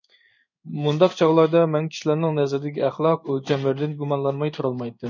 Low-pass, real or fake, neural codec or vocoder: 7.2 kHz; fake; codec, 16 kHz in and 24 kHz out, 1 kbps, XY-Tokenizer